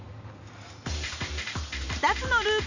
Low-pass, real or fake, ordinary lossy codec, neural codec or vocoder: 7.2 kHz; real; none; none